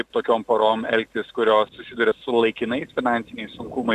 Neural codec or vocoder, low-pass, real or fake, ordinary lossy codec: none; 14.4 kHz; real; MP3, 96 kbps